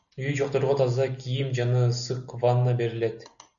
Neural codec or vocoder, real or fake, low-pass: none; real; 7.2 kHz